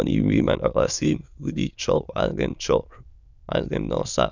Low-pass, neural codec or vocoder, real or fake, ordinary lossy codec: 7.2 kHz; autoencoder, 22.05 kHz, a latent of 192 numbers a frame, VITS, trained on many speakers; fake; none